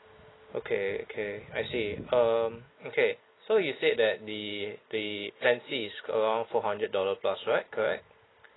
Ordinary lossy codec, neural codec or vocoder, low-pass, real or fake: AAC, 16 kbps; none; 7.2 kHz; real